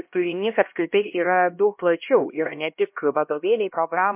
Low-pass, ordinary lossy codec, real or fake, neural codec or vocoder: 3.6 kHz; MP3, 32 kbps; fake; codec, 16 kHz, 1 kbps, X-Codec, HuBERT features, trained on LibriSpeech